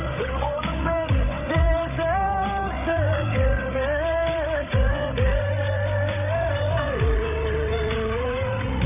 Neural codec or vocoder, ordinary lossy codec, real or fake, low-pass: codec, 16 kHz, 16 kbps, FreqCodec, larger model; none; fake; 3.6 kHz